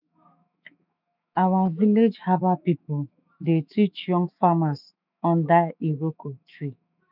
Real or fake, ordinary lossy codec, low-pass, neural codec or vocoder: fake; none; 5.4 kHz; autoencoder, 48 kHz, 128 numbers a frame, DAC-VAE, trained on Japanese speech